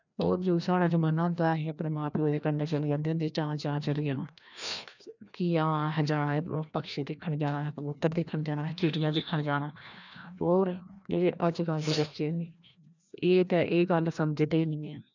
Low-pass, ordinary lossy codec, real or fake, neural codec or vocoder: 7.2 kHz; none; fake; codec, 16 kHz, 1 kbps, FreqCodec, larger model